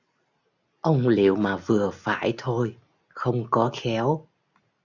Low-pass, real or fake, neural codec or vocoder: 7.2 kHz; real; none